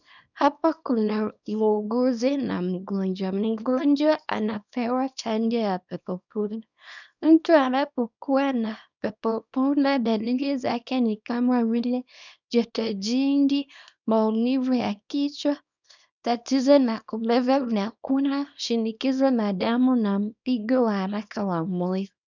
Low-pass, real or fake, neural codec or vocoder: 7.2 kHz; fake; codec, 24 kHz, 0.9 kbps, WavTokenizer, small release